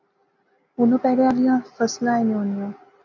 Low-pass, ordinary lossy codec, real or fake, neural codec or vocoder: 7.2 kHz; MP3, 48 kbps; real; none